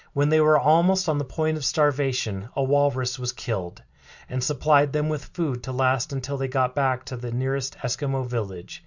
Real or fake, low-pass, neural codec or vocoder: real; 7.2 kHz; none